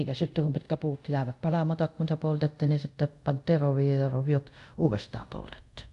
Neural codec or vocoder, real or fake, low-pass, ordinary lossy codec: codec, 24 kHz, 0.5 kbps, DualCodec; fake; 10.8 kHz; none